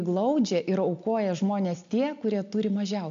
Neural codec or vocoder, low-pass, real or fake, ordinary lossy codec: none; 7.2 kHz; real; AAC, 64 kbps